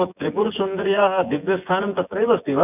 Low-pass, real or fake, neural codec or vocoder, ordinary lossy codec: 3.6 kHz; fake; vocoder, 24 kHz, 100 mel bands, Vocos; none